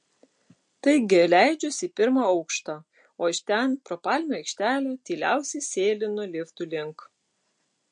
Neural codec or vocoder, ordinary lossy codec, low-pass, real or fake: none; MP3, 48 kbps; 9.9 kHz; real